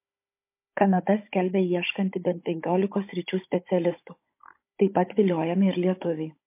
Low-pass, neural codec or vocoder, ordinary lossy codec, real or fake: 3.6 kHz; codec, 16 kHz, 16 kbps, FunCodec, trained on Chinese and English, 50 frames a second; MP3, 24 kbps; fake